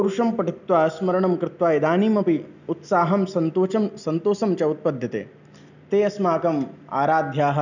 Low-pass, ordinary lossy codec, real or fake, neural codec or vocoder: 7.2 kHz; none; real; none